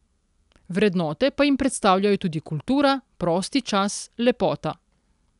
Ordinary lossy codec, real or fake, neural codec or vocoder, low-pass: none; real; none; 10.8 kHz